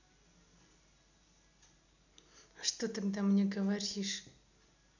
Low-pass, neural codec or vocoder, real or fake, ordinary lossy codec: 7.2 kHz; none; real; none